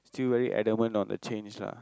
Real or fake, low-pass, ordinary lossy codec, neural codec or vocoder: real; none; none; none